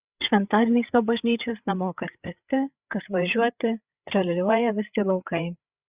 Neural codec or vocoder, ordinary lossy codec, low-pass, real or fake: codec, 16 kHz, 8 kbps, FreqCodec, larger model; Opus, 64 kbps; 3.6 kHz; fake